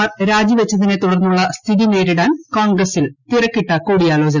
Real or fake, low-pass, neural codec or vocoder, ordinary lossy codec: real; 7.2 kHz; none; none